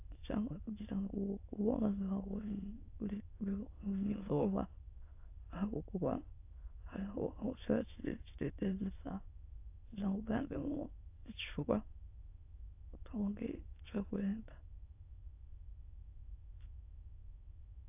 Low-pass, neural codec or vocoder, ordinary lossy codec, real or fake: 3.6 kHz; autoencoder, 22.05 kHz, a latent of 192 numbers a frame, VITS, trained on many speakers; AAC, 32 kbps; fake